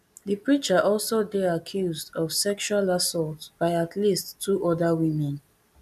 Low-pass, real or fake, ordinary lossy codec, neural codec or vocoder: 14.4 kHz; real; none; none